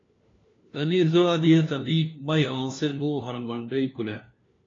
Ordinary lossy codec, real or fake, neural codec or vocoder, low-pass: AAC, 32 kbps; fake; codec, 16 kHz, 1 kbps, FunCodec, trained on LibriTTS, 50 frames a second; 7.2 kHz